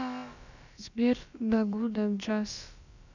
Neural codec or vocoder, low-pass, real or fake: codec, 16 kHz, about 1 kbps, DyCAST, with the encoder's durations; 7.2 kHz; fake